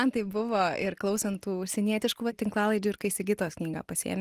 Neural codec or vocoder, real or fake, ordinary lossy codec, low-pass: none; real; Opus, 24 kbps; 14.4 kHz